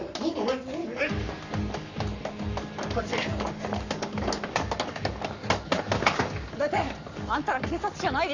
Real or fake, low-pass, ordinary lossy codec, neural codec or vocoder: fake; 7.2 kHz; none; codec, 44.1 kHz, 7.8 kbps, Pupu-Codec